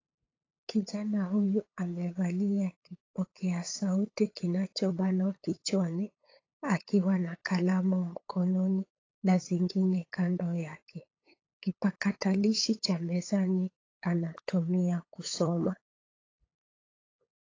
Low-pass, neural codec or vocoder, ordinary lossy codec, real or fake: 7.2 kHz; codec, 16 kHz, 8 kbps, FunCodec, trained on LibriTTS, 25 frames a second; AAC, 32 kbps; fake